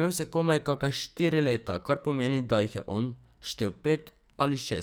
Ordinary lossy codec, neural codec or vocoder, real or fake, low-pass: none; codec, 44.1 kHz, 2.6 kbps, SNAC; fake; none